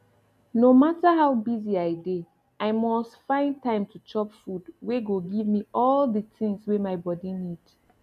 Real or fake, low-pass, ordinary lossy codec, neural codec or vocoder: real; 14.4 kHz; none; none